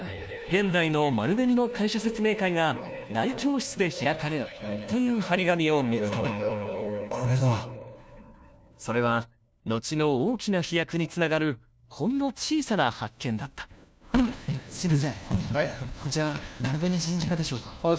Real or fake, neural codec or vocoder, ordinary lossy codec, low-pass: fake; codec, 16 kHz, 1 kbps, FunCodec, trained on LibriTTS, 50 frames a second; none; none